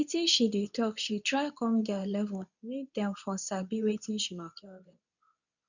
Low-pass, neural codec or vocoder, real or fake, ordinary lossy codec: 7.2 kHz; codec, 24 kHz, 0.9 kbps, WavTokenizer, medium speech release version 2; fake; none